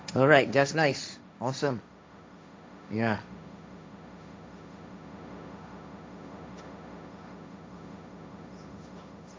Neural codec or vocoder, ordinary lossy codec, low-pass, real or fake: codec, 16 kHz, 1.1 kbps, Voila-Tokenizer; none; none; fake